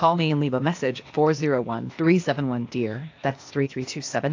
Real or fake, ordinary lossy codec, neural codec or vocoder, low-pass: fake; AAC, 48 kbps; codec, 16 kHz, 0.8 kbps, ZipCodec; 7.2 kHz